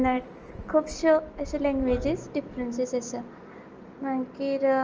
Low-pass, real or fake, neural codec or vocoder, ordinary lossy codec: 7.2 kHz; real; none; Opus, 24 kbps